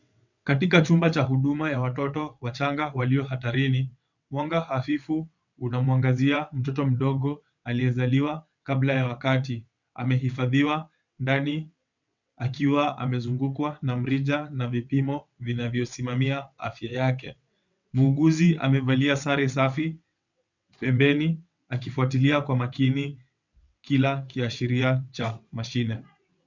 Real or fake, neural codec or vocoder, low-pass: fake; vocoder, 22.05 kHz, 80 mel bands, WaveNeXt; 7.2 kHz